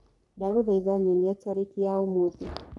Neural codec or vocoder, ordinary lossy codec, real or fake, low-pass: codec, 44.1 kHz, 3.4 kbps, Pupu-Codec; none; fake; 10.8 kHz